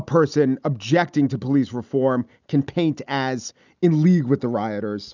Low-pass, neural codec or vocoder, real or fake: 7.2 kHz; none; real